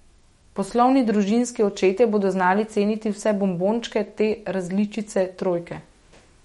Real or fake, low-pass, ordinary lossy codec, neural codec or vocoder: fake; 19.8 kHz; MP3, 48 kbps; autoencoder, 48 kHz, 128 numbers a frame, DAC-VAE, trained on Japanese speech